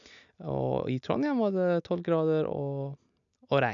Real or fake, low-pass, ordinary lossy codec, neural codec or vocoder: real; 7.2 kHz; none; none